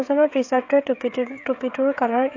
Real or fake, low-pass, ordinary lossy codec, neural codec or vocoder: fake; 7.2 kHz; AAC, 48 kbps; codec, 16 kHz, 16 kbps, FreqCodec, smaller model